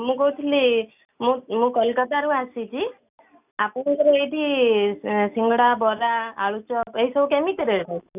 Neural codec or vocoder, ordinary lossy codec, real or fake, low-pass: none; none; real; 3.6 kHz